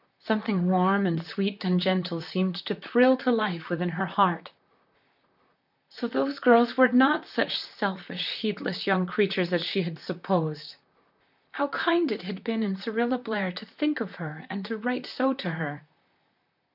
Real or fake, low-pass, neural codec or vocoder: fake; 5.4 kHz; vocoder, 44.1 kHz, 128 mel bands, Pupu-Vocoder